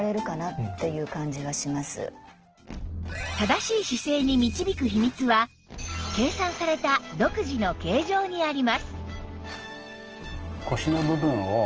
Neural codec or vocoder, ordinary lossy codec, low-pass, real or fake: none; Opus, 16 kbps; 7.2 kHz; real